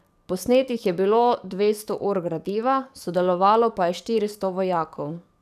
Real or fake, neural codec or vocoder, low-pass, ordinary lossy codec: fake; codec, 44.1 kHz, 7.8 kbps, DAC; 14.4 kHz; none